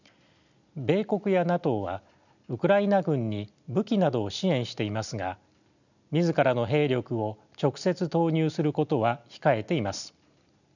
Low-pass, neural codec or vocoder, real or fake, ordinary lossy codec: 7.2 kHz; none; real; none